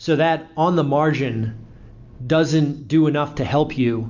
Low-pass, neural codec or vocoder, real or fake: 7.2 kHz; none; real